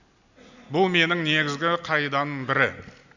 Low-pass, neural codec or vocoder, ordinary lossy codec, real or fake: 7.2 kHz; none; none; real